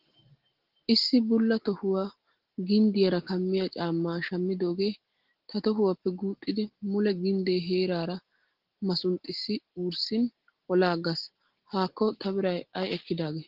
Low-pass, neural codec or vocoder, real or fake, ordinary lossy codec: 5.4 kHz; none; real; Opus, 16 kbps